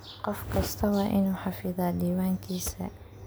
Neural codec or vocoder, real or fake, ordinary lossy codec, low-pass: none; real; none; none